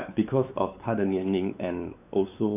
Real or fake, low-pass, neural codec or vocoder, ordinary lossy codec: fake; 3.6 kHz; codec, 16 kHz, 4 kbps, X-Codec, WavLM features, trained on Multilingual LibriSpeech; none